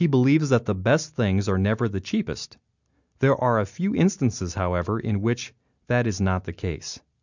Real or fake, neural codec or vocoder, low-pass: real; none; 7.2 kHz